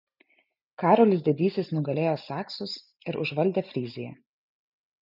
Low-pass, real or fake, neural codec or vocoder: 5.4 kHz; real; none